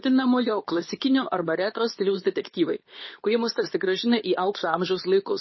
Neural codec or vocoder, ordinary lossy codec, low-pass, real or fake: codec, 24 kHz, 0.9 kbps, WavTokenizer, medium speech release version 2; MP3, 24 kbps; 7.2 kHz; fake